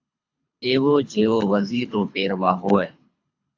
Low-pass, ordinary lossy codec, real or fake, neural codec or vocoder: 7.2 kHz; AAC, 48 kbps; fake; codec, 24 kHz, 3 kbps, HILCodec